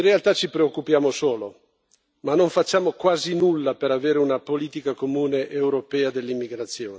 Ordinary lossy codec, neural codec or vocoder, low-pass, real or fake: none; none; none; real